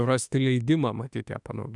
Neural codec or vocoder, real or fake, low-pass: autoencoder, 48 kHz, 32 numbers a frame, DAC-VAE, trained on Japanese speech; fake; 10.8 kHz